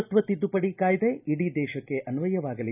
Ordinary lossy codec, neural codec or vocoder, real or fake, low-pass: none; none; real; 3.6 kHz